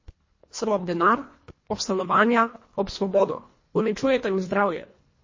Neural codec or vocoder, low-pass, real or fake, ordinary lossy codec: codec, 24 kHz, 1.5 kbps, HILCodec; 7.2 kHz; fake; MP3, 32 kbps